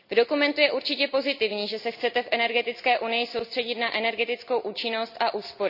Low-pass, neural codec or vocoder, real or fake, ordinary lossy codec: 5.4 kHz; none; real; none